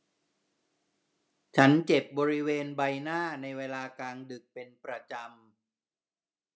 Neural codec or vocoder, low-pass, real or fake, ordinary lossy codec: none; none; real; none